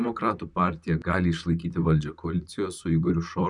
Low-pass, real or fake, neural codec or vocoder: 10.8 kHz; fake; vocoder, 44.1 kHz, 128 mel bands, Pupu-Vocoder